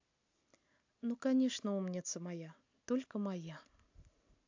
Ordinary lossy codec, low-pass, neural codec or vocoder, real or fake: AAC, 48 kbps; 7.2 kHz; none; real